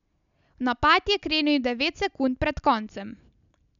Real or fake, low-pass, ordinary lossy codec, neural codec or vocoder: real; 7.2 kHz; none; none